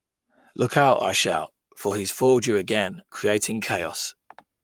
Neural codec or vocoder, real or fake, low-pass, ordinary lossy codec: codec, 44.1 kHz, 7.8 kbps, Pupu-Codec; fake; 19.8 kHz; Opus, 32 kbps